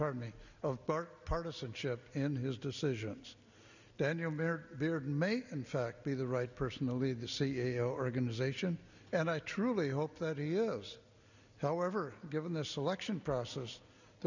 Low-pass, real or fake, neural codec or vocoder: 7.2 kHz; real; none